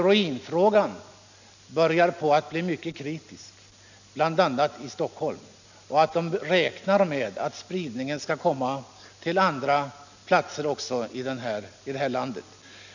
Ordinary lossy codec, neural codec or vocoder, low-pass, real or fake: none; none; 7.2 kHz; real